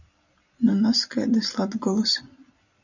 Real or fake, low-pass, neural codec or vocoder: fake; 7.2 kHz; vocoder, 44.1 kHz, 128 mel bands every 256 samples, BigVGAN v2